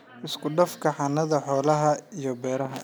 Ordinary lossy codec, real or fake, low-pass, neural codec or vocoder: none; real; none; none